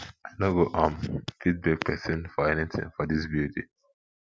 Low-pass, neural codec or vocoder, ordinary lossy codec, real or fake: none; none; none; real